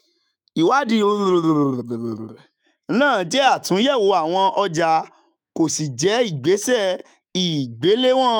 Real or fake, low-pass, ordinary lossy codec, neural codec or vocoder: fake; 19.8 kHz; none; autoencoder, 48 kHz, 128 numbers a frame, DAC-VAE, trained on Japanese speech